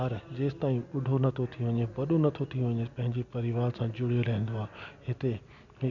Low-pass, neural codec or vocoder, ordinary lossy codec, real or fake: 7.2 kHz; none; none; real